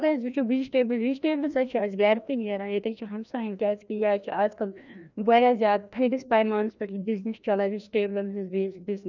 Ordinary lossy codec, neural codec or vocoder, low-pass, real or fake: none; codec, 16 kHz, 1 kbps, FreqCodec, larger model; 7.2 kHz; fake